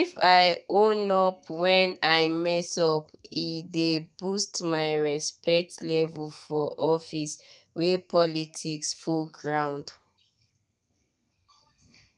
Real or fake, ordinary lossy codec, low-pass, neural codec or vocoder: fake; none; 10.8 kHz; codec, 32 kHz, 1.9 kbps, SNAC